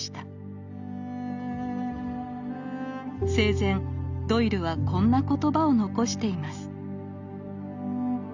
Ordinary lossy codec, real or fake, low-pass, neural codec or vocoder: none; real; 7.2 kHz; none